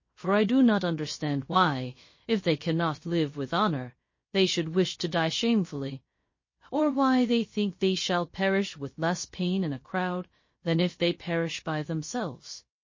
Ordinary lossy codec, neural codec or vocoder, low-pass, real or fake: MP3, 32 kbps; codec, 16 kHz, 0.3 kbps, FocalCodec; 7.2 kHz; fake